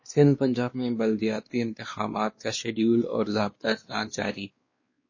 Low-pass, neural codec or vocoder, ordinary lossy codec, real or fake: 7.2 kHz; codec, 16 kHz, 2 kbps, X-Codec, WavLM features, trained on Multilingual LibriSpeech; MP3, 32 kbps; fake